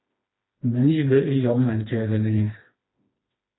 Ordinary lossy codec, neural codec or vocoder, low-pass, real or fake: AAC, 16 kbps; codec, 16 kHz, 2 kbps, FreqCodec, smaller model; 7.2 kHz; fake